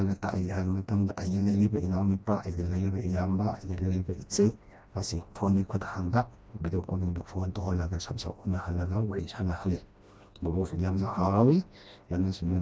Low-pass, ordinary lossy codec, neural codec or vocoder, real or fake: none; none; codec, 16 kHz, 1 kbps, FreqCodec, smaller model; fake